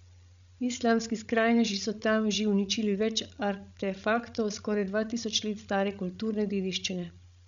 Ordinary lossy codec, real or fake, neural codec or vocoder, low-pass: MP3, 96 kbps; fake; codec, 16 kHz, 16 kbps, FreqCodec, larger model; 7.2 kHz